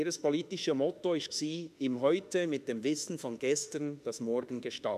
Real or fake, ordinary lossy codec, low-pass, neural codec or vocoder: fake; none; 14.4 kHz; autoencoder, 48 kHz, 32 numbers a frame, DAC-VAE, trained on Japanese speech